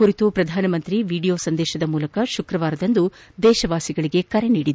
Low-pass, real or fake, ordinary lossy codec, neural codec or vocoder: none; real; none; none